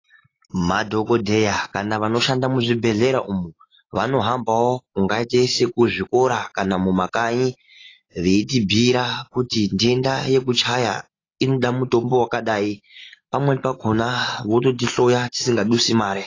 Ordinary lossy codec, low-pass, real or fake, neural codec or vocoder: AAC, 32 kbps; 7.2 kHz; real; none